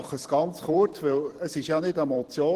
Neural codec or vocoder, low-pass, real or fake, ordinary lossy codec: vocoder, 44.1 kHz, 128 mel bands every 512 samples, BigVGAN v2; 14.4 kHz; fake; Opus, 16 kbps